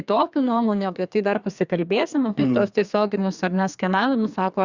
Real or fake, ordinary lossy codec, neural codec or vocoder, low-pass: fake; Opus, 64 kbps; codec, 32 kHz, 1.9 kbps, SNAC; 7.2 kHz